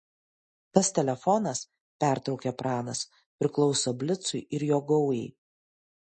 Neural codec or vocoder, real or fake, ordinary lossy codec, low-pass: none; real; MP3, 32 kbps; 10.8 kHz